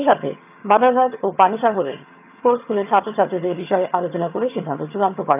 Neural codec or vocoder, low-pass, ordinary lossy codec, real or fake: vocoder, 22.05 kHz, 80 mel bands, HiFi-GAN; 3.6 kHz; AAC, 32 kbps; fake